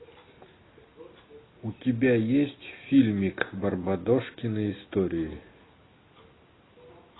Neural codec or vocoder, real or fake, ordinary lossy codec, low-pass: none; real; AAC, 16 kbps; 7.2 kHz